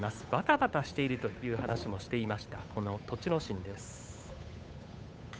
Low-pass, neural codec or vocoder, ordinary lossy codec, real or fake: none; codec, 16 kHz, 8 kbps, FunCodec, trained on Chinese and English, 25 frames a second; none; fake